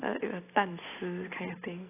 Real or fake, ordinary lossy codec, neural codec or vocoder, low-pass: real; AAC, 16 kbps; none; 3.6 kHz